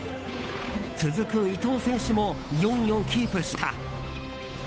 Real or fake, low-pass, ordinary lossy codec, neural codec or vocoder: fake; none; none; codec, 16 kHz, 8 kbps, FunCodec, trained on Chinese and English, 25 frames a second